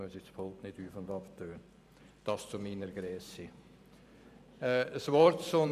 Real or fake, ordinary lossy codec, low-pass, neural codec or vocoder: fake; none; 14.4 kHz; vocoder, 44.1 kHz, 128 mel bands every 512 samples, BigVGAN v2